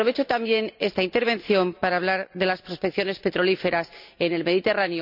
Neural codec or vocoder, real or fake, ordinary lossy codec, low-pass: none; real; none; 5.4 kHz